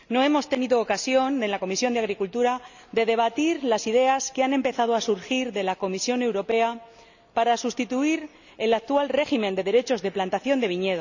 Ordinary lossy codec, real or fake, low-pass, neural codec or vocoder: none; real; 7.2 kHz; none